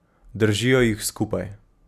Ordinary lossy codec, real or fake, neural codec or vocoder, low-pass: none; real; none; 14.4 kHz